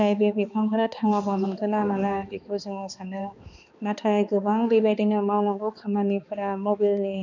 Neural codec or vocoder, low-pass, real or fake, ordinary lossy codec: codec, 16 kHz, 2 kbps, X-Codec, HuBERT features, trained on balanced general audio; 7.2 kHz; fake; none